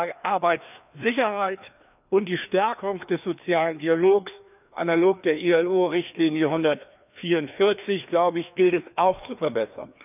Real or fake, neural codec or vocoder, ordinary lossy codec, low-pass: fake; codec, 16 kHz, 2 kbps, FreqCodec, larger model; none; 3.6 kHz